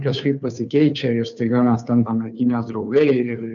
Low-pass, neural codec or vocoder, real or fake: 7.2 kHz; codec, 16 kHz, 2 kbps, FunCodec, trained on Chinese and English, 25 frames a second; fake